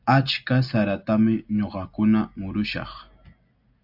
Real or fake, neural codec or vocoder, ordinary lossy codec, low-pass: real; none; AAC, 48 kbps; 5.4 kHz